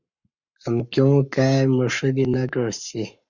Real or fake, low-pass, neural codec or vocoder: fake; 7.2 kHz; codec, 44.1 kHz, 7.8 kbps, Pupu-Codec